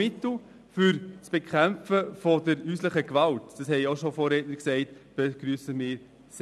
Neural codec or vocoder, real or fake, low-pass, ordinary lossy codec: none; real; none; none